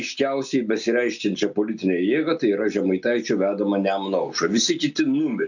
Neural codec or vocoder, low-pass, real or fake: none; 7.2 kHz; real